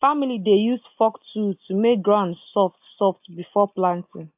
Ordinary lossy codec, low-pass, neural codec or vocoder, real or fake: AAC, 32 kbps; 3.6 kHz; none; real